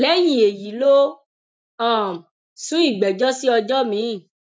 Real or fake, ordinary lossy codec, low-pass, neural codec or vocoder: real; none; none; none